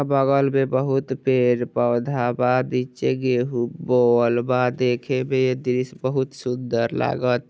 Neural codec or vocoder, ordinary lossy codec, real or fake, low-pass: none; none; real; none